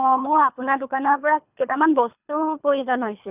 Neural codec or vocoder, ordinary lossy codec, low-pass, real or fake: codec, 24 kHz, 3 kbps, HILCodec; none; 3.6 kHz; fake